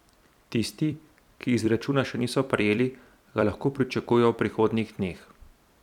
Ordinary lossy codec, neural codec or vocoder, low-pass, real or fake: none; vocoder, 44.1 kHz, 128 mel bands every 256 samples, BigVGAN v2; 19.8 kHz; fake